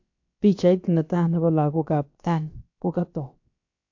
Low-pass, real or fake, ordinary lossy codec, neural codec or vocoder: 7.2 kHz; fake; AAC, 48 kbps; codec, 16 kHz, about 1 kbps, DyCAST, with the encoder's durations